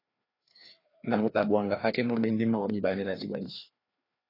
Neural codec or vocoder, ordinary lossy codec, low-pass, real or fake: codec, 16 kHz, 2 kbps, FreqCodec, larger model; AAC, 24 kbps; 5.4 kHz; fake